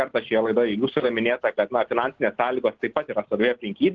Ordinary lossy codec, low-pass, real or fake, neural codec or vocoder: Opus, 16 kbps; 7.2 kHz; real; none